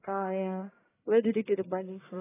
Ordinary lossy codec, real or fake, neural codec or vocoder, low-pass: AAC, 16 kbps; fake; codec, 44.1 kHz, 1.7 kbps, Pupu-Codec; 3.6 kHz